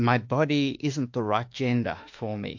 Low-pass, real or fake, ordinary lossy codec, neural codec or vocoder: 7.2 kHz; fake; MP3, 48 kbps; autoencoder, 48 kHz, 32 numbers a frame, DAC-VAE, trained on Japanese speech